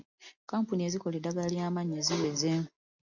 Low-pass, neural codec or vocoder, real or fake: 7.2 kHz; none; real